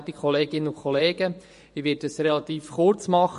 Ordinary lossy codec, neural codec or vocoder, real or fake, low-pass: MP3, 48 kbps; vocoder, 24 kHz, 100 mel bands, Vocos; fake; 10.8 kHz